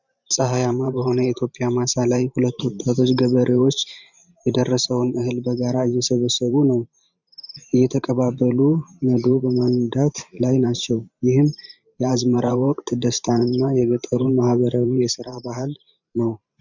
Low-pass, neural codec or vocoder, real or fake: 7.2 kHz; vocoder, 44.1 kHz, 128 mel bands every 512 samples, BigVGAN v2; fake